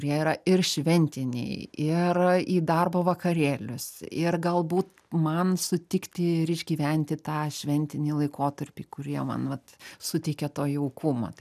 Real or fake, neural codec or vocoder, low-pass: real; none; 14.4 kHz